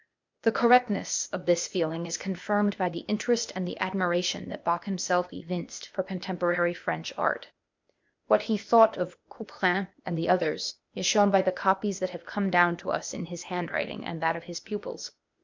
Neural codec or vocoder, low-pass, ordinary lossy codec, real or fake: codec, 16 kHz, 0.8 kbps, ZipCodec; 7.2 kHz; MP3, 64 kbps; fake